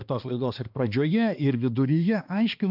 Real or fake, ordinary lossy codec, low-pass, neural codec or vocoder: fake; AAC, 48 kbps; 5.4 kHz; codec, 16 kHz, 2 kbps, X-Codec, HuBERT features, trained on balanced general audio